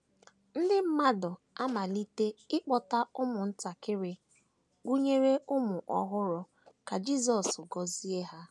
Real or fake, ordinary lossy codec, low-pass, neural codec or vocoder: real; none; none; none